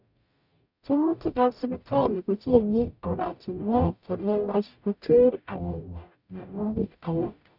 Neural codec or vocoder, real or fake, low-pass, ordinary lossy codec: codec, 44.1 kHz, 0.9 kbps, DAC; fake; 5.4 kHz; none